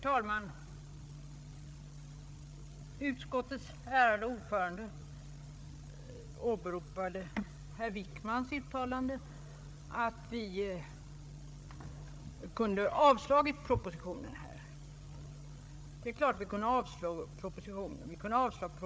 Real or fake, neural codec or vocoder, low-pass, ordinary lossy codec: fake; codec, 16 kHz, 8 kbps, FreqCodec, larger model; none; none